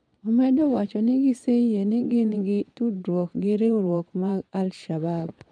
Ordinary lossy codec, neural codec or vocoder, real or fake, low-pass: none; vocoder, 22.05 kHz, 80 mel bands, Vocos; fake; 9.9 kHz